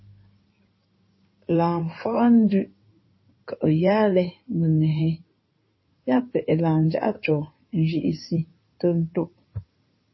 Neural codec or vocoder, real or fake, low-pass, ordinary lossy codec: codec, 16 kHz in and 24 kHz out, 2.2 kbps, FireRedTTS-2 codec; fake; 7.2 kHz; MP3, 24 kbps